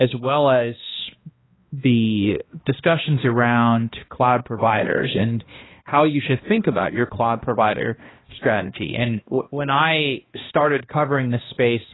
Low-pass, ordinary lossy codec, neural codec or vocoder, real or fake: 7.2 kHz; AAC, 16 kbps; codec, 16 kHz, 1 kbps, X-Codec, HuBERT features, trained on balanced general audio; fake